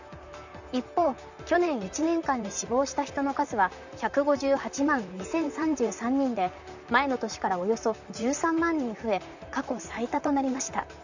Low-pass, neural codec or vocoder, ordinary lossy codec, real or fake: 7.2 kHz; vocoder, 44.1 kHz, 128 mel bands, Pupu-Vocoder; none; fake